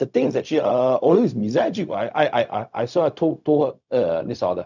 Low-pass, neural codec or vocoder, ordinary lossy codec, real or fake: 7.2 kHz; codec, 16 kHz, 0.4 kbps, LongCat-Audio-Codec; none; fake